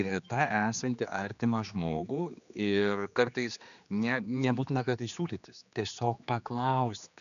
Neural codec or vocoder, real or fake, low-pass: codec, 16 kHz, 2 kbps, X-Codec, HuBERT features, trained on general audio; fake; 7.2 kHz